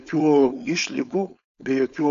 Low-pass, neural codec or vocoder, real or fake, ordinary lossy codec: 7.2 kHz; codec, 16 kHz, 4.8 kbps, FACodec; fake; MP3, 64 kbps